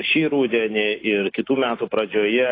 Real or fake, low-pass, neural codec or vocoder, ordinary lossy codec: real; 5.4 kHz; none; AAC, 24 kbps